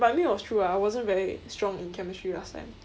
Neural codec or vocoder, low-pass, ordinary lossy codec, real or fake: none; none; none; real